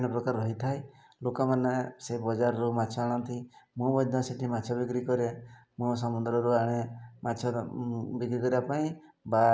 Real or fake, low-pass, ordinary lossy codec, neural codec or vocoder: real; none; none; none